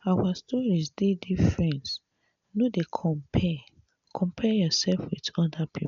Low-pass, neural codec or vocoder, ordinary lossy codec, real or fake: 7.2 kHz; none; none; real